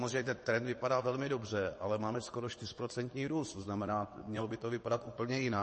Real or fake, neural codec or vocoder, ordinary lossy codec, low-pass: fake; vocoder, 22.05 kHz, 80 mel bands, WaveNeXt; MP3, 32 kbps; 9.9 kHz